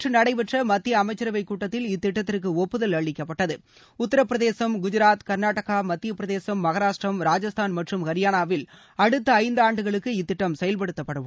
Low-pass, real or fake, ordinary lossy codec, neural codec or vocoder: none; real; none; none